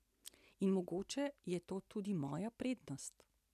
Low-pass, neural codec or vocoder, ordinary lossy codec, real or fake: 14.4 kHz; none; none; real